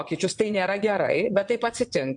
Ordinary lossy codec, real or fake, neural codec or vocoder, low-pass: MP3, 64 kbps; fake; vocoder, 22.05 kHz, 80 mel bands, WaveNeXt; 9.9 kHz